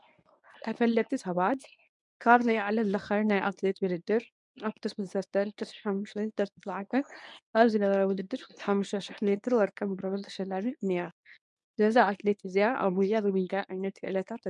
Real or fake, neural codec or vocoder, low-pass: fake; codec, 24 kHz, 0.9 kbps, WavTokenizer, medium speech release version 1; 10.8 kHz